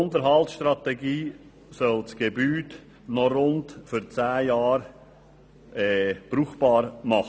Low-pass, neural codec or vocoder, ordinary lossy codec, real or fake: none; none; none; real